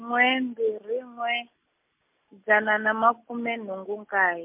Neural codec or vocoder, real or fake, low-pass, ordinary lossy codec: none; real; 3.6 kHz; MP3, 32 kbps